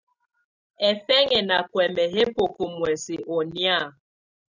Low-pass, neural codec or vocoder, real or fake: 7.2 kHz; none; real